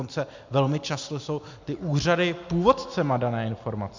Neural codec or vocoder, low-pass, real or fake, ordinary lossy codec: none; 7.2 kHz; real; MP3, 64 kbps